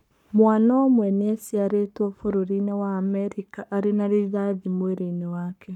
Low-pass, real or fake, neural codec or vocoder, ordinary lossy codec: 19.8 kHz; fake; codec, 44.1 kHz, 7.8 kbps, Pupu-Codec; none